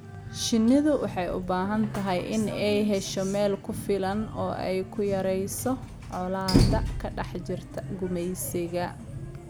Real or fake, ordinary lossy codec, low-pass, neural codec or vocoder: real; none; none; none